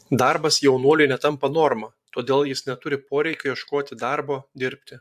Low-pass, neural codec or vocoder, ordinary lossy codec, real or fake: 14.4 kHz; vocoder, 44.1 kHz, 128 mel bands every 512 samples, BigVGAN v2; AAC, 96 kbps; fake